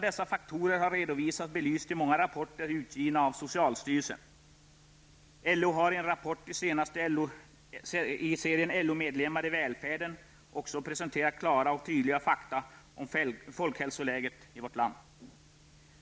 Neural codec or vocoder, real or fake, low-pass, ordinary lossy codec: none; real; none; none